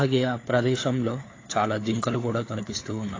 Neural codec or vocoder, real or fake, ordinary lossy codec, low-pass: codec, 16 kHz, 4 kbps, FunCodec, trained on LibriTTS, 50 frames a second; fake; AAC, 32 kbps; 7.2 kHz